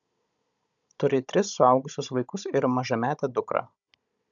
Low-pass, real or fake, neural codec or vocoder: 7.2 kHz; fake; codec, 16 kHz, 16 kbps, FunCodec, trained on Chinese and English, 50 frames a second